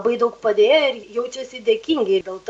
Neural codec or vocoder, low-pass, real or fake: none; 9.9 kHz; real